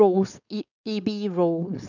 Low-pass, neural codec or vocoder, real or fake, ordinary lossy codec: 7.2 kHz; codec, 16 kHz, 4.8 kbps, FACodec; fake; none